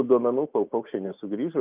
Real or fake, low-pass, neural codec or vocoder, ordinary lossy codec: real; 3.6 kHz; none; Opus, 32 kbps